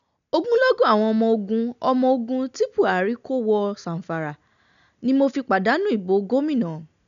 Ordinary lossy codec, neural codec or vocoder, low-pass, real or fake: none; none; 7.2 kHz; real